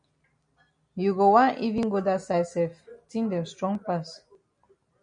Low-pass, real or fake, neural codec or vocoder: 9.9 kHz; real; none